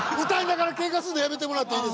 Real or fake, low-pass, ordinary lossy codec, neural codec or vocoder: real; none; none; none